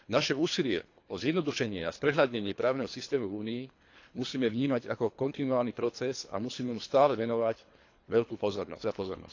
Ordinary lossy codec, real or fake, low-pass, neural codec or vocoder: AAC, 48 kbps; fake; 7.2 kHz; codec, 24 kHz, 3 kbps, HILCodec